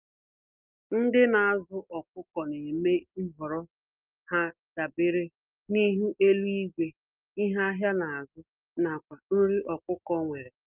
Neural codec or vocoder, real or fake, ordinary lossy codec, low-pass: none; real; Opus, 32 kbps; 3.6 kHz